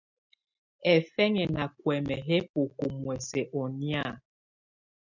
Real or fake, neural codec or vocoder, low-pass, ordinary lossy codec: real; none; 7.2 kHz; MP3, 64 kbps